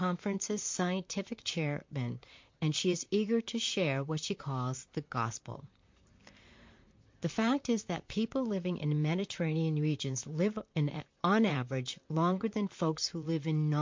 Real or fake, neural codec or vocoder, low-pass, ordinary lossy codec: fake; vocoder, 44.1 kHz, 128 mel bands, Pupu-Vocoder; 7.2 kHz; MP3, 48 kbps